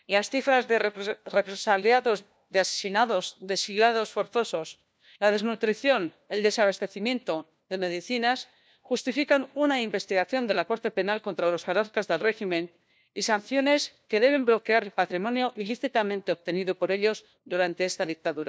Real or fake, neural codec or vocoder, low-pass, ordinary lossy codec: fake; codec, 16 kHz, 1 kbps, FunCodec, trained on LibriTTS, 50 frames a second; none; none